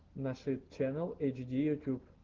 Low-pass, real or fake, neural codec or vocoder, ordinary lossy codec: 7.2 kHz; real; none; Opus, 16 kbps